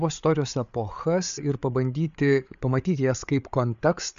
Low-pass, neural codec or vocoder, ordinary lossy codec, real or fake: 7.2 kHz; codec, 16 kHz, 8 kbps, FreqCodec, larger model; MP3, 64 kbps; fake